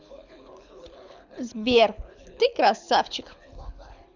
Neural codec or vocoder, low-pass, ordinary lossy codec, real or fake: codec, 24 kHz, 6 kbps, HILCodec; 7.2 kHz; none; fake